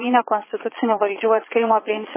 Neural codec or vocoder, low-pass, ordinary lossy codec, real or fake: vocoder, 22.05 kHz, 80 mel bands, Vocos; 3.6 kHz; MP3, 16 kbps; fake